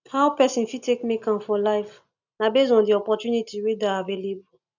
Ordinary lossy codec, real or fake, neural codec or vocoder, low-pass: none; real; none; 7.2 kHz